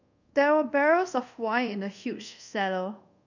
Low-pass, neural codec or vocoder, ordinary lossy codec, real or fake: 7.2 kHz; codec, 24 kHz, 0.5 kbps, DualCodec; none; fake